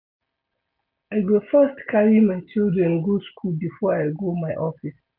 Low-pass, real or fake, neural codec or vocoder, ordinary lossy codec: 5.4 kHz; real; none; MP3, 48 kbps